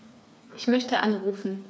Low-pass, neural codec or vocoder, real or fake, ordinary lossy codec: none; codec, 16 kHz, 4 kbps, FreqCodec, smaller model; fake; none